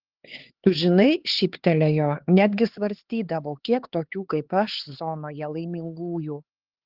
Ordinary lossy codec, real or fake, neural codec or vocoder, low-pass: Opus, 16 kbps; fake; codec, 16 kHz, 4 kbps, X-Codec, HuBERT features, trained on LibriSpeech; 5.4 kHz